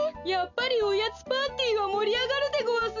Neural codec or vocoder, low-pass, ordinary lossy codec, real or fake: none; 7.2 kHz; none; real